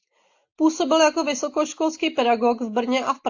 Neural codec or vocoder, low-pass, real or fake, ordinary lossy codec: none; 7.2 kHz; real; AAC, 48 kbps